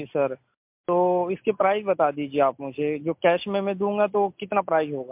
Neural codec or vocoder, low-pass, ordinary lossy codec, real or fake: none; 3.6 kHz; MP3, 32 kbps; real